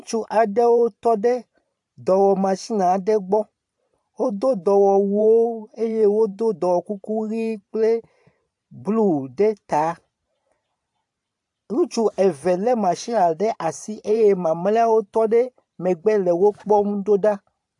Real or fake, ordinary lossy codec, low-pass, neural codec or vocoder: fake; AAC, 64 kbps; 10.8 kHz; vocoder, 44.1 kHz, 128 mel bands every 512 samples, BigVGAN v2